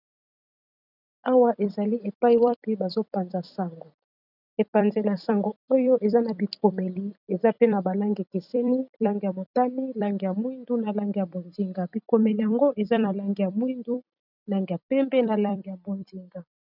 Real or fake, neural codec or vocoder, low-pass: fake; vocoder, 44.1 kHz, 128 mel bands every 256 samples, BigVGAN v2; 5.4 kHz